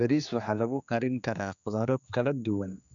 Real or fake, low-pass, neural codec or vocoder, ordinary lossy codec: fake; 7.2 kHz; codec, 16 kHz, 2 kbps, X-Codec, HuBERT features, trained on general audio; none